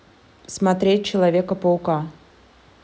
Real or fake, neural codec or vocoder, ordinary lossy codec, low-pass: real; none; none; none